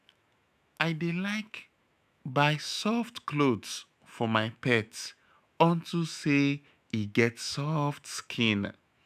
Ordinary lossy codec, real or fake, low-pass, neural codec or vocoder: none; fake; 14.4 kHz; autoencoder, 48 kHz, 128 numbers a frame, DAC-VAE, trained on Japanese speech